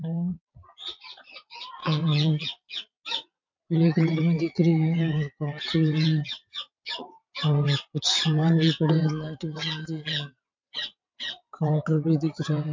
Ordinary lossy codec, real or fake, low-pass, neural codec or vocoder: MP3, 48 kbps; fake; 7.2 kHz; vocoder, 22.05 kHz, 80 mel bands, WaveNeXt